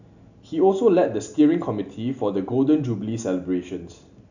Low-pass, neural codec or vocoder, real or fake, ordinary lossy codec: 7.2 kHz; none; real; none